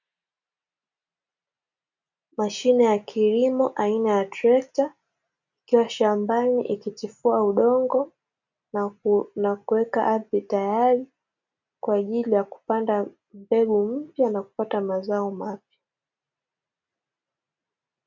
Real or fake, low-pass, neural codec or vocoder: real; 7.2 kHz; none